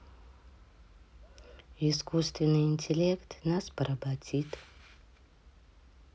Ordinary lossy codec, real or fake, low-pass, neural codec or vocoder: none; real; none; none